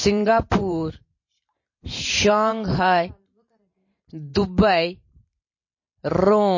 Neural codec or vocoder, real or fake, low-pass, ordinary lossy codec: vocoder, 44.1 kHz, 128 mel bands every 512 samples, BigVGAN v2; fake; 7.2 kHz; MP3, 32 kbps